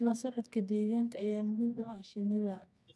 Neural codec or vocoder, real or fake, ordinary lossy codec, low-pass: codec, 24 kHz, 0.9 kbps, WavTokenizer, medium music audio release; fake; none; none